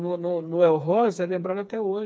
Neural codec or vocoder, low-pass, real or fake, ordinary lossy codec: codec, 16 kHz, 4 kbps, FreqCodec, smaller model; none; fake; none